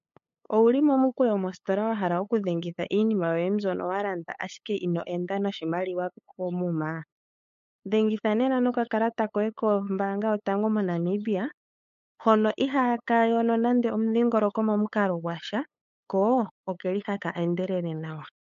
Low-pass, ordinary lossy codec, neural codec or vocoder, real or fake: 7.2 kHz; MP3, 48 kbps; codec, 16 kHz, 8 kbps, FunCodec, trained on LibriTTS, 25 frames a second; fake